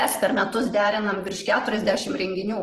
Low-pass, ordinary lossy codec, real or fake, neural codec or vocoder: 14.4 kHz; Opus, 16 kbps; fake; vocoder, 44.1 kHz, 128 mel bands every 512 samples, BigVGAN v2